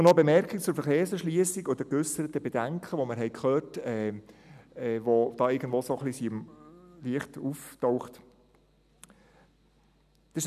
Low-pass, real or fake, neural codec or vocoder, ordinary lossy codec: 14.4 kHz; real; none; none